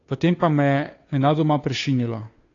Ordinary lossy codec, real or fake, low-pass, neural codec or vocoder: AAC, 32 kbps; fake; 7.2 kHz; codec, 16 kHz, 2 kbps, FunCodec, trained on Chinese and English, 25 frames a second